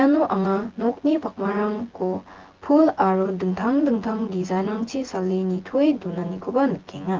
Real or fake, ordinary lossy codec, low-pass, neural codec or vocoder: fake; Opus, 16 kbps; 7.2 kHz; vocoder, 24 kHz, 100 mel bands, Vocos